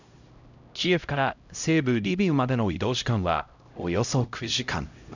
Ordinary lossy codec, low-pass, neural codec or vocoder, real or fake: none; 7.2 kHz; codec, 16 kHz, 0.5 kbps, X-Codec, HuBERT features, trained on LibriSpeech; fake